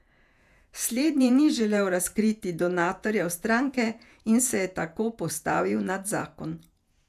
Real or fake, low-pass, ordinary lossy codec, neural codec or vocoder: fake; 14.4 kHz; none; vocoder, 48 kHz, 128 mel bands, Vocos